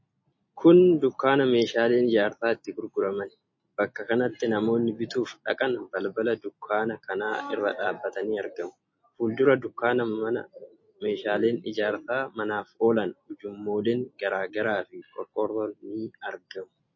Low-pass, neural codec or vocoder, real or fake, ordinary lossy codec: 7.2 kHz; none; real; MP3, 32 kbps